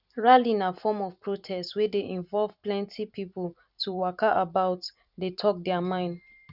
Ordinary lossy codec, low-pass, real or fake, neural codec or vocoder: none; 5.4 kHz; fake; vocoder, 44.1 kHz, 128 mel bands every 512 samples, BigVGAN v2